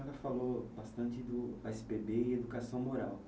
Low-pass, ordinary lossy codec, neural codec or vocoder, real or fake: none; none; none; real